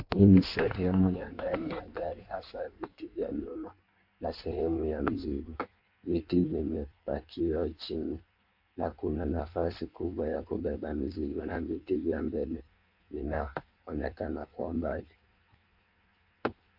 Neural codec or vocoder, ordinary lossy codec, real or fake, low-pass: codec, 16 kHz in and 24 kHz out, 1.1 kbps, FireRedTTS-2 codec; MP3, 48 kbps; fake; 5.4 kHz